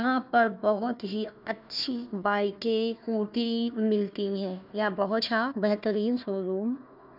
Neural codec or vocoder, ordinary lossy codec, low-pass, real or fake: codec, 16 kHz, 1 kbps, FunCodec, trained on Chinese and English, 50 frames a second; none; 5.4 kHz; fake